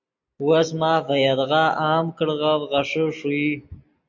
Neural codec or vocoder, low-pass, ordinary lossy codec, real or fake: none; 7.2 kHz; MP3, 48 kbps; real